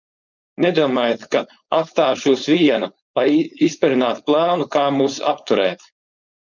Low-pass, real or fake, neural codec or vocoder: 7.2 kHz; fake; codec, 16 kHz, 4.8 kbps, FACodec